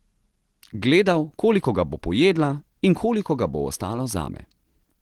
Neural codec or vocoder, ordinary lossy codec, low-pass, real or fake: none; Opus, 16 kbps; 19.8 kHz; real